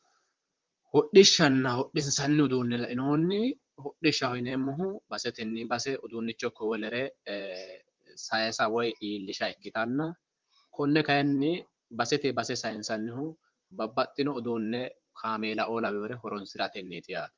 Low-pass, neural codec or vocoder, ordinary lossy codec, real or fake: 7.2 kHz; vocoder, 44.1 kHz, 128 mel bands, Pupu-Vocoder; Opus, 24 kbps; fake